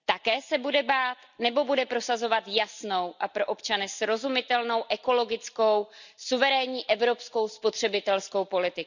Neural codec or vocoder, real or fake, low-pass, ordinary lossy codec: none; real; 7.2 kHz; none